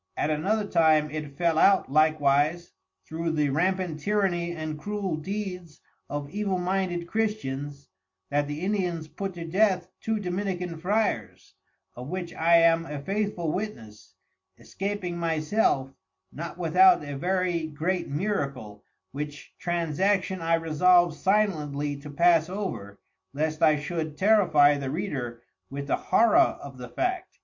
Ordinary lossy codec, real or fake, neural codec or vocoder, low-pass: MP3, 48 kbps; real; none; 7.2 kHz